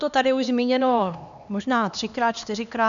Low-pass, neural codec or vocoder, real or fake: 7.2 kHz; codec, 16 kHz, 2 kbps, X-Codec, HuBERT features, trained on LibriSpeech; fake